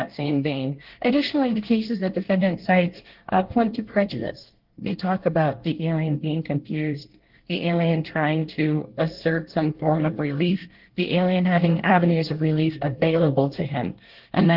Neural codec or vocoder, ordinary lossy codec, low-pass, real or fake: codec, 24 kHz, 1 kbps, SNAC; Opus, 16 kbps; 5.4 kHz; fake